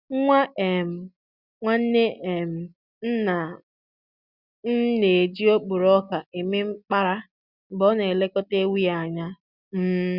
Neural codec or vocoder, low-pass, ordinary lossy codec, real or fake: none; 5.4 kHz; Opus, 64 kbps; real